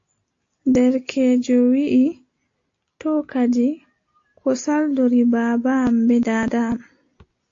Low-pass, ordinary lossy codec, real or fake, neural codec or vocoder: 7.2 kHz; AAC, 48 kbps; real; none